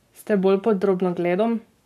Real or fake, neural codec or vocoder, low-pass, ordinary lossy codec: fake; codec, 44.1 kHz, 7.8 kbps, Pupu-Codec; 14.4 kHz; none